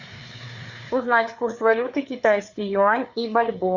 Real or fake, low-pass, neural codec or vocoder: fake; 7.2 kHz; codec, 16 kHz, 4 kbps, FreqCodec, larger model